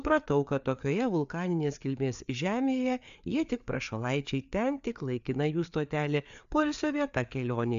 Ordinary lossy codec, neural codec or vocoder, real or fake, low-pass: MP3, 64 kbps; codec, 16 kHz, 4 kbps, FreqCodec, larger model; fake; 7.2 kHz